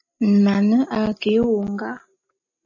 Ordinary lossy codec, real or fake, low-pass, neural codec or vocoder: MP3, 32 kbps; real; 7.2 kHz; none